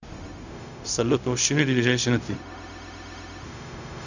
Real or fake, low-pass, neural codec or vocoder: fake; 7.2 kHz; codec, 16 kHz, 0.4 kbps, LongCat-Audio-Codec